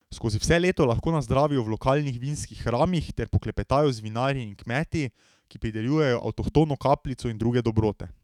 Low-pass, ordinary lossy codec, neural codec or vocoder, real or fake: 19.8 kHz; none; autoencoder, 48 kHz, 128 numbers a frame, DAC-VAE, trained on Japanese speech; fake